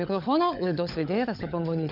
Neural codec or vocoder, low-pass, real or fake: codec, 16 kHz, 16 kbps, FunCodec, trained on LibriTTS, 50 frames a second; 5.4 kHz; fake